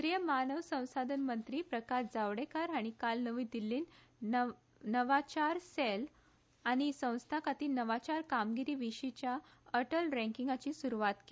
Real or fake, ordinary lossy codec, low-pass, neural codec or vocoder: real; none; none; none